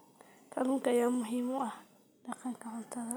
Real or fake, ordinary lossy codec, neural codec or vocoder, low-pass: real; none; none; none